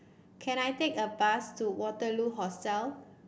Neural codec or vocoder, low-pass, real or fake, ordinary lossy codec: none; none; real; none